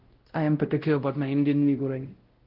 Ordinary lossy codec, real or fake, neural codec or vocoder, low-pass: Opus, 16 kbps; fake; codec, 16 kHz, 0.5 kbps, X-Codec, WavLM features, trained on Multilingual LibriSpeech; 5.4 kHz